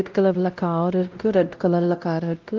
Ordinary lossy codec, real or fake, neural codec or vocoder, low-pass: Opus, 32 kbps; fake; codec, 16 kHz, 0.5 kbps, X-Codec, WavLM features, trained on Multilingual LibriSpeech; 7.2 kHz